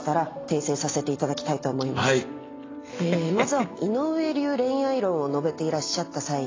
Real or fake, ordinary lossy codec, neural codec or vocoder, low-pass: real; AAC, 32 kbps; none; 7.2 kHz